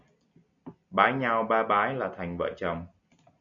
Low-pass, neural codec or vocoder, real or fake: 7.2 kHz; none; real